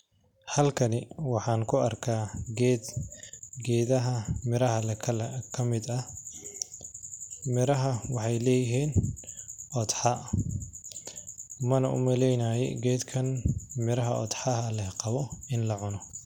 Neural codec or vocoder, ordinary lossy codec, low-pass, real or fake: none; none; 19.8 kHz; real